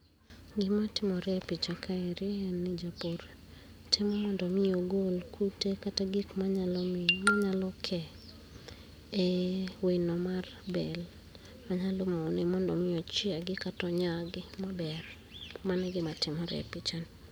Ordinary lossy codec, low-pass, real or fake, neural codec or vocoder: none; none; real; none